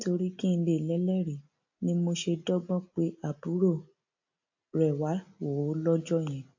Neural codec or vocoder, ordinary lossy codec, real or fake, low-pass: none; AAC, 48 kbps; real; 7.2 kHz